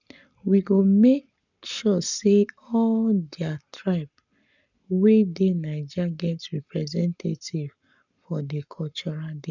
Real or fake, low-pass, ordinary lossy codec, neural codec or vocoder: fake; 7.2 kHz; none; codec, 44.1 kHz, 7.8 kbps, Pupu-Codec